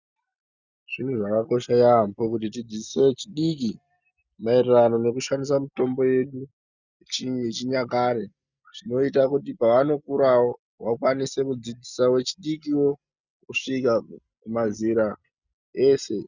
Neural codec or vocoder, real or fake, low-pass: none; real; 7.2 kHz